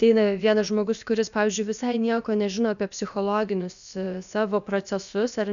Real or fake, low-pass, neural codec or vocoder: fake; 7.2 kHz; codec, 16 kHz, about 1 kbps, DyCAST, with the encoder's durations